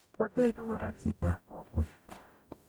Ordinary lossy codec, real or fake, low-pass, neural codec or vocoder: none; fake; none; codec, 44.1 kHz, 0.9 kbps, DAC